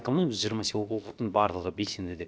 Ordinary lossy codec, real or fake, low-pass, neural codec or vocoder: none; fake; none; codec, 16 kHz, about 1 kbps, DyCAST, with the encoder's durations